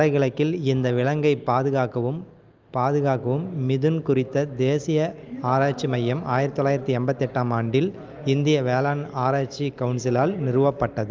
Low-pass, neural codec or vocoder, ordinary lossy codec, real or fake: 7.2 kHz; none; Opus, 24 kbps; real